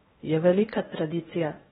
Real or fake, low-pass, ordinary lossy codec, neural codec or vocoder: fake; 10.8 kHz; AAC, 16 kbps; codec, 16 kHz in and 24 kHz out, 0.8 kbps, FocalCodec, streaming, 65536 codes